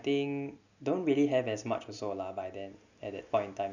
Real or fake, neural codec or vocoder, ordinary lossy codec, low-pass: real; none; none; 7.2 kHz